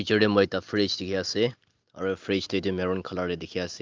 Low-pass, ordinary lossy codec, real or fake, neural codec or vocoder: 7.2 kHz; Opus, 32 kbps; real; none